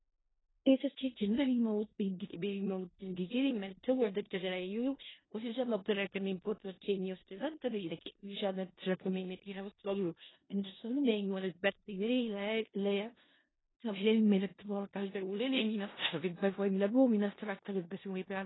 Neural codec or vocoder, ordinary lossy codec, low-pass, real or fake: codec, 16 kHz in and 24 kHz out, 0.4 kbps, LongCat-Audio-Codec, four codebook decoder; AAC, 16 kbps; 7.2 kHz; fake